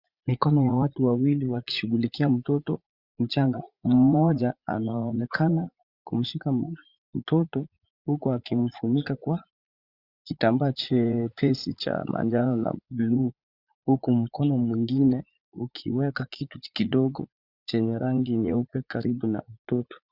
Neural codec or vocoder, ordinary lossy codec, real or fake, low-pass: vocoder, 44.1 kHz, 80 mel bands, Vocos; Opus, 64 kbps; fake; 5.4 kHz